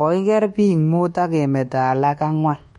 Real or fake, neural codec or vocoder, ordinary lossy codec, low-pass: fake; codec, 24 kHz, 1.2 kbps, DualCodec; MP3, 48 kbps; 10.8 kHz